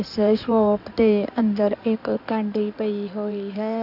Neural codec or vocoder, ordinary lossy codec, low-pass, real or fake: codec, 16 kHz in and 24 kHz out, 2.2 kbps, FireRedTTS-2 codec; none; 5.4 kHz; fake